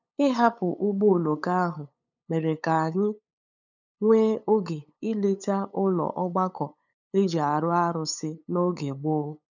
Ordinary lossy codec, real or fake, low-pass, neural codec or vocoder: none; fake; 7.2 kHz; codec, 16 kHz, 8 kbps, FunCodec, trained on LibriTTS, 25 frames a second